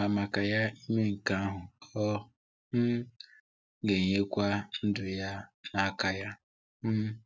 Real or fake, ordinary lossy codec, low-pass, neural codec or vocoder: real; none; none; none